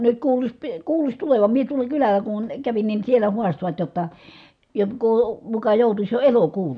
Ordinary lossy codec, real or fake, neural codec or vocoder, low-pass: none; real; none; 9.9 kHz